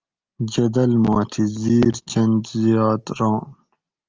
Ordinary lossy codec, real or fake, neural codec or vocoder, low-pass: Opus, 24 kbps; real; none; 7.2 kHz